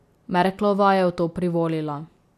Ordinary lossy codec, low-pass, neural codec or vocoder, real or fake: none; 14.4 kHz; none; real